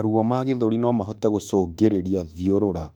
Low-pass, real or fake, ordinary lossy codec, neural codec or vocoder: 19.8 kHz; fake; none; autoencoder, 48 kHz, 32 numbers a frame, DAC-VAE, trained on Japanese speech